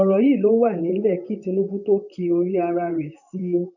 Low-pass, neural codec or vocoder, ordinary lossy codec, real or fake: 7.2 kHz; vocoder, 44.1 kHz, 128 mel bands, Pupu-Vocoder; none; fake